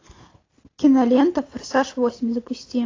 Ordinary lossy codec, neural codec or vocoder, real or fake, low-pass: AAC, 32 kbps; none; real; 7.2 kHz